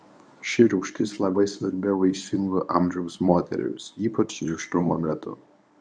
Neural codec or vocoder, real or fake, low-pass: codec, 24 kHz, 0.9 kbps, WavTokenizer, medium speech release version 1; fake; 9.9 kHz